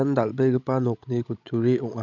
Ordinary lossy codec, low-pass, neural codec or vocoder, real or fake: none; 7.2 kHz; codec, 16 kHz, 16 kbps, FreqCodec, larger model; fake